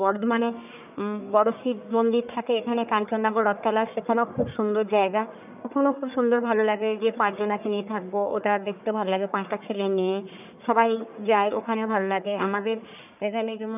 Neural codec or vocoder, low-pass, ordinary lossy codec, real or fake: codec, 44.1 kHz, 1.7 kbps, Pupu-Codec; 3.6 kHz; none; fake